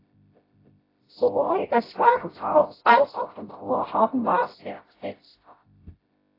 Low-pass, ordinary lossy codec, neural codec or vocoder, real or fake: 5.4 kHz; AAC, 24 kbps; codec, 16 kHz, 0.5 kbps, FreqCodec, smaller model; fake